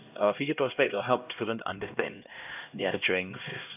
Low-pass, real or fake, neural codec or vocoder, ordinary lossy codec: 3.6 kHz; fake; codec, 16 kHz, 1 kbps, X-Codec, HuBERT features, trained on LibriSpeech; none